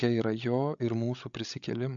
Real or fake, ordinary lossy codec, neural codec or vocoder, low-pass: fake; MP3, 64 kbps; codec, 16 kHz, 16 kbps, FreqCodec, larger model; 7.2 kHz